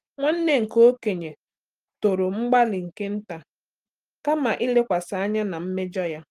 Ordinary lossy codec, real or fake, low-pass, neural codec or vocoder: Opus, 24 kbps; real; 14.4 kHz; none